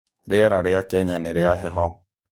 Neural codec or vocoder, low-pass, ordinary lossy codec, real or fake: codec, 44.1 kHz, 2.6 kbps, DAC; 19.8 kHz; none; fake